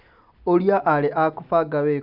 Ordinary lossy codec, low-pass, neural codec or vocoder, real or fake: none; 5.4 kHz; none; real